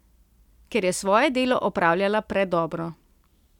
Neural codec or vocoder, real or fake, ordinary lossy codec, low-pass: none; real; none; 19.8 kHz